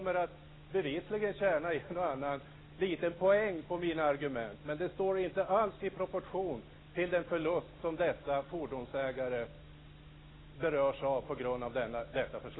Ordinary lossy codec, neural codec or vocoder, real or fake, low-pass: AAC, 16 kbps; none; real; 7.2 kHz